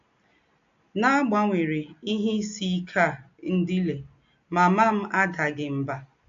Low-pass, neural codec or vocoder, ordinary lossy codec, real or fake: 7.2 kHz; none; AAC, 64 kbps; real